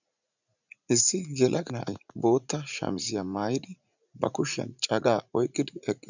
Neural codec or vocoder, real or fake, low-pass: vocoder, 44.1 kHz, 80 mel bands, Vocos; fake; 7.2 kHz